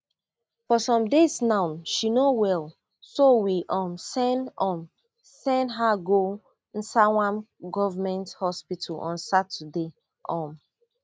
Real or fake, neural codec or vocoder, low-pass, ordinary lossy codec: real; none; none; none